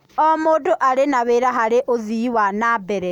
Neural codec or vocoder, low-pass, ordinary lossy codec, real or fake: none; 19.8 kHz; none; real